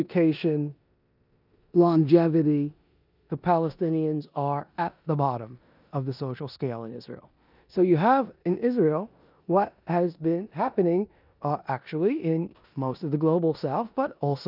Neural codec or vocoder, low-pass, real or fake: codec, 16 kHz in and 24 kHz out, 0.9 kbps, LongCat-Audio-Codec, four codebook decoder; 5.4 kHz; fake